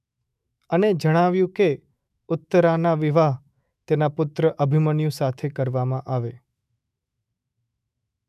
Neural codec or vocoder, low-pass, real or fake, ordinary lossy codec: autoencoder, 48 kHz, 128 numbers a frame, DAC-VAE, trained on Japanese speech; 14.4 kHz; fake; none